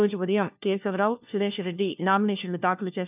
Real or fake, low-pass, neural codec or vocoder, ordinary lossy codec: fake; 3.6 kHz; codec, 16 kHz, 1 kbps, FunCodec, trained on LibriTTS, 50 frames a second; none